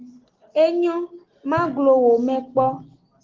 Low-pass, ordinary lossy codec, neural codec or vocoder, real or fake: 7.2 kHz; Opus, 16 kbps; none; real